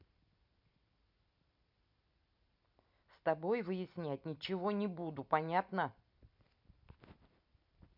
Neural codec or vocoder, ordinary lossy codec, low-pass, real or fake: vocoder, 44.1 kHz, 128 mel bands every 256 samples, BigVGAN v2; none; 5.4 kHz; fake